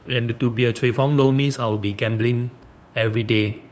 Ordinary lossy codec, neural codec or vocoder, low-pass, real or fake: none; codec, 16 kHz, 2 kbps, FunCodec, trained on LibriTTS, 25 frames a second; none; fake